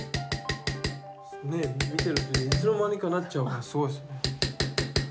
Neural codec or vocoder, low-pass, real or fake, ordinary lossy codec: none; none; real; none